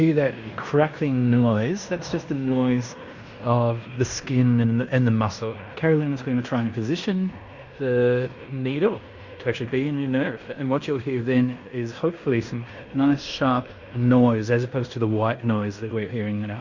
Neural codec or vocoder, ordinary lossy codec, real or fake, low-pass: codec, 16 kHz in and 24 kHz out, 0.9 kbps, LongCat-Audio-Codec, fine tuned four codebook decoder; Opus, 64 kbps; fake; 7.2 kHz